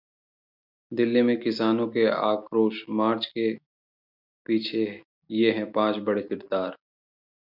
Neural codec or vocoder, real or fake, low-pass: none; real; 5.4 kHz